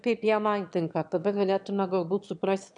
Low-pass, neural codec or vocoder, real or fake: 9.9 kHz; autoencoder, 22.05 kHz, a latent of 192 numbers a frame, VITS, trained on one speaker; fake